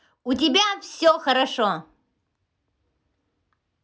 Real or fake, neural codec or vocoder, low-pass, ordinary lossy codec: real; none; none; none